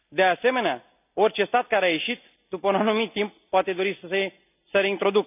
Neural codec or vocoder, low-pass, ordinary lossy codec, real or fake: none; 3.6 kHz; none; real